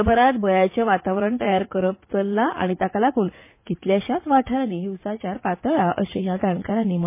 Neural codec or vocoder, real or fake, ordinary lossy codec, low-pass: vocoder, 44.1 kHz, 80 mel bands, Vocos; fake; MP3, 24 kbps; 3.6 kHz